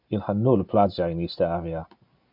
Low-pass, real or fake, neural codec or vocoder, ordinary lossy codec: 5.4 kHz; real; none; MP3, 48 kbps